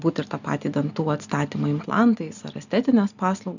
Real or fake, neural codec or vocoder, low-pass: real; none; 7.2 kHz